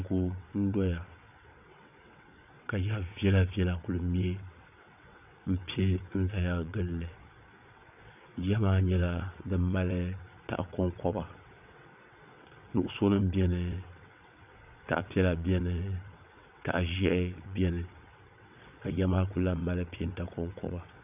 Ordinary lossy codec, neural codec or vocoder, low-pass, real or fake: AAC, 32 kbps; vocoder, 22.05 kHz, 80 mel bands, WaveNeXt; 3.6 kHz; fake